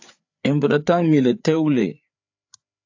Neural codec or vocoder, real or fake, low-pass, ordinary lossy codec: codec, 16 kHz, 4 kbps, FreqCodec, larger model; fake; 7.2 kHz; AAC, 48 kbps